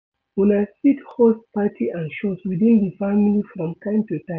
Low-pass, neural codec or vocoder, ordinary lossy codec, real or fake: none; none; none; real